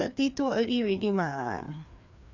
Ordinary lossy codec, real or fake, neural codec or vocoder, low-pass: none; fake; codec, 16 kHz, 2 kbps, FreqCodec, larger model; 7.2 kHz